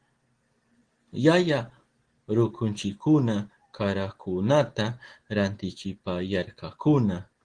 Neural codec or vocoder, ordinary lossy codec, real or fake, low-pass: none; Opus, 16 kbps; real; 9.9 kHz